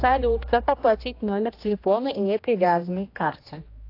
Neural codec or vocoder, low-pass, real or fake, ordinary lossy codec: codec, 16 kHz, 1 kbps, X-Codec, HuBERT features, trained on general audio; 5.4 kHz; fake; AAC, 32 kbps